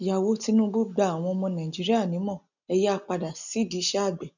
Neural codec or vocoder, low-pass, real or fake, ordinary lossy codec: none; 7.2 kHz; real; none